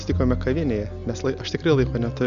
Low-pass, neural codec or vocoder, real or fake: 7.2 kHz; none; real